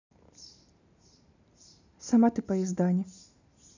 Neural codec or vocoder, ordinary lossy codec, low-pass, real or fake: none; none; 7.2 kHz; real